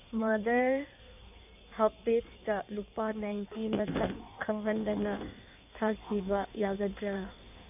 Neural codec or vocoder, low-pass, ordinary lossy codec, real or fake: codec, 16 kHz in and 24 kHz out, 1.1 kbps, FireRedTTS-2 codec; 3.6 kHz; none; fake